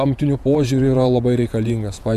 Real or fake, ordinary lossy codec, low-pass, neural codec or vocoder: fake; MP3, 96 kbps; 14.4 kHz; vocoder, 48 kHz, 128 mel bands, Vocos